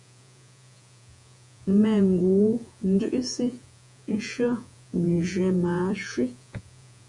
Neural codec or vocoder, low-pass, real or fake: vocoder, 48 kHz, 128 mel bands, Vocos; 10.8 kHz; fake